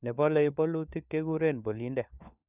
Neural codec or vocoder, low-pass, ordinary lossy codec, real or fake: codec, 16 kHz, 4 kbps, FunCodec, trained on LibriTTS, 50 frames a second; 3.6 kHz; none; fake